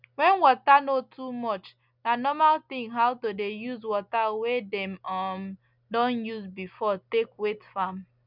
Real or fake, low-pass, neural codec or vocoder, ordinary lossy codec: real; 5.4 kHz; none; none